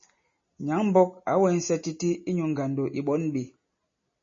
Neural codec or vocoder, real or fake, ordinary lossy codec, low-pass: none; real; MP3, 32 kbps; 7.2 kHz